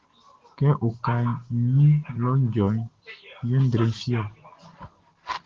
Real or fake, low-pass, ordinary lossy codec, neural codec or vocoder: real; 7.2 kHz; Opus, 16 kbps; none